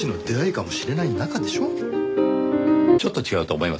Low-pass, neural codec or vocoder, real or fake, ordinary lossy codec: none; none; real; none